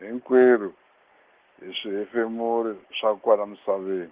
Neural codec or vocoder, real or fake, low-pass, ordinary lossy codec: none; real; 3.6 kHz; Opus, 16 kbps